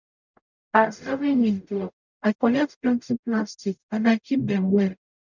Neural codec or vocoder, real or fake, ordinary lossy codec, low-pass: codec, 44.1 kHz, 0.9 kbps, DAC; fake; none; 7.2 kHz